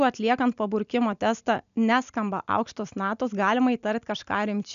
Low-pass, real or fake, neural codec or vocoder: 7.2 kHz; real; none